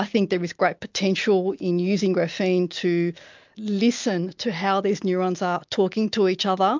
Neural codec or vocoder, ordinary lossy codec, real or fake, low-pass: none; MP3, 64 kbps; real; 7.2 kHz